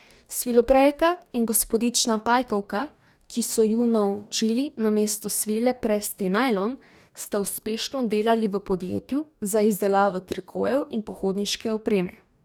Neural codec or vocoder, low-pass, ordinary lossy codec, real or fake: codec, 44.1 kHz, 2.6 kbps, DAC; 19.8 kHz; none; fake